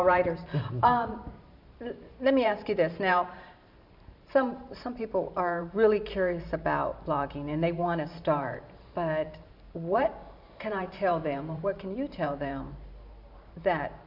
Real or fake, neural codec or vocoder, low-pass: fake; vocoder, 44.1 kHz, 128 mel bands every 512 samples, BigVGAN v2; 5.4 kHz